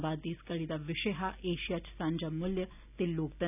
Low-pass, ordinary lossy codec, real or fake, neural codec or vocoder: 3.6 kHz; none; real; none